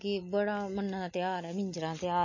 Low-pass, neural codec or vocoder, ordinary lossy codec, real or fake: 7.2 kHz; none; MP3, 32 kbps; real